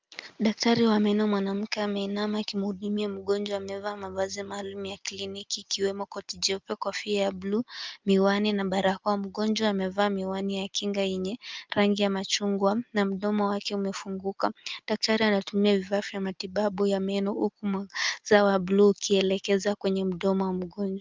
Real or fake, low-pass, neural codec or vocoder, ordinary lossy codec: real; 7.2 kHz; none; Opus, 24 kbps